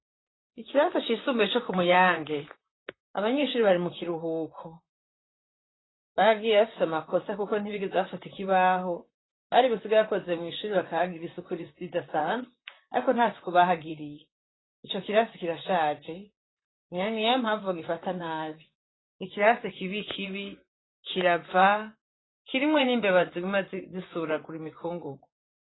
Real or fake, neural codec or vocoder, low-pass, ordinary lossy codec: fake; vocoder, 44.1 kHz, 128 mel bands, Pupu-Vocoder; 7.2 kHz; AAC, 16 kbps